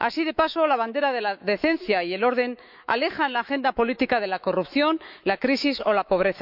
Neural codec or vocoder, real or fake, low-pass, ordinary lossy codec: autoencoder, 48 kHz, 128 numbers a frame, DAC-VAE, trained on Japanese speech; fake; 5.4 kHz; none